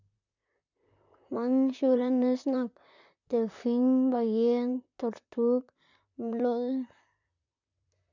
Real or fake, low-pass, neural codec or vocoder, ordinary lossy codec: fake; 7.2 kHz; vocoder, 44.1 kHz, 128 mel bands, Pupu-Vocoder; none